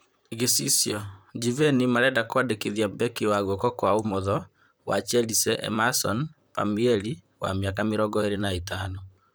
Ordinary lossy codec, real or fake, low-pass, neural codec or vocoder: none; fake; none; vocoder, 44.1 kHz, 128 mel bands, Pupu-Vocoder